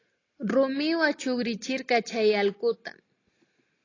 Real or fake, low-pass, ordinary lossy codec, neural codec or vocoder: real; 7.2 kHz; AAC, 32 kbps; none